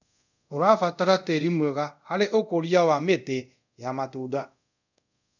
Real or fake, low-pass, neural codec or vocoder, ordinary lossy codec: fake; 7.2 kHz; codec, 24 kHz, 0.5 kbps, DualCodec; none